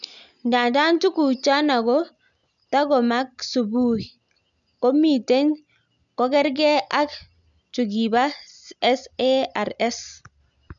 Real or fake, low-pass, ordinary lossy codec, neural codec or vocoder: real; 7.2 kHz; none; none